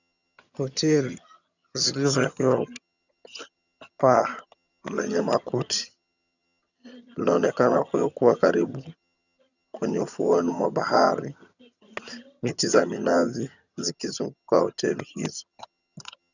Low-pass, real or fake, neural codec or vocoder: 7.2 kHz; fake; vocoder, 22.05 kHz, 80 mel bands, HiFi-GAN